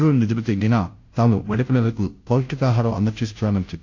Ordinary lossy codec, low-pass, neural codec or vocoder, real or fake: AAC, 48 kbps; 7.2 kHz; codec, 16 kHz, 0.5 kbps, FunCodec, trained on Chinese and English, 25 frames a second; fake